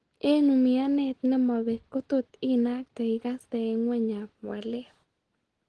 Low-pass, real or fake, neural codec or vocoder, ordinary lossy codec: 10.8 kHz; real; none; Opus, 16 kbps